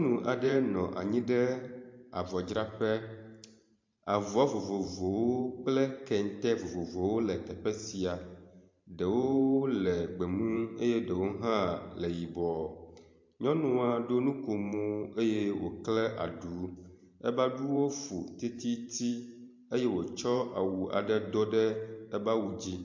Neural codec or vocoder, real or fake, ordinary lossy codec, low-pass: vocoder, 44.1 kHz, 128 mel bands every 512 samples, BigVGAN v2; fake; MP3, 48 kbps; 7.2 kHz